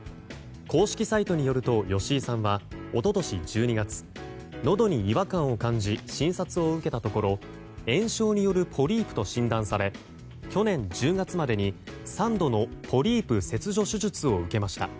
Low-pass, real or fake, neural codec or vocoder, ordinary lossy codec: none; real; none; none